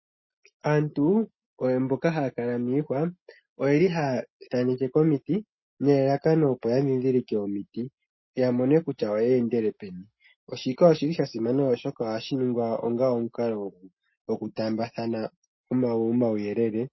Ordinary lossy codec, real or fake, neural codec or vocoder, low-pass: MP3, 24 kbps; real; none; 7.2 kHz